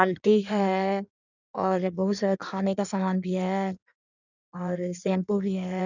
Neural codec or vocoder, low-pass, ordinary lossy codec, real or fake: codec, 16 kHz in and 24 kHz out, 1.1 kbps, FireRedTTS-2 codec; 7.2 kHz; none; fake